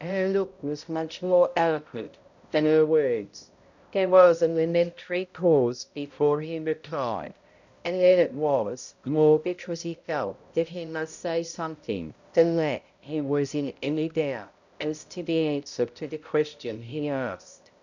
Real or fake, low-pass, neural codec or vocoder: fake; 7.2 kHz; codec, 16 kHz, 0.5 kbps, X-Codec, HuBERT features, trained on balanced general audio